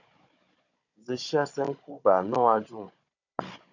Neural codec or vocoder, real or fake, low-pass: codec, 16 kHz, 16 kbps, FunCodec, trained on Chinese and English, 50 frames a second; fake; 7.2 kHz